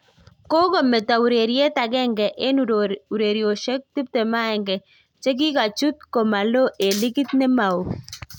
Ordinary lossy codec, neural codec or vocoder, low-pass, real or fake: none; none; 19.8 kHz; real